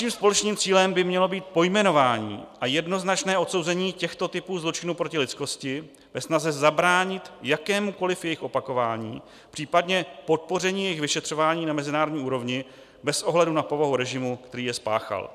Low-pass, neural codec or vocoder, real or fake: 14.4 kHz; none; real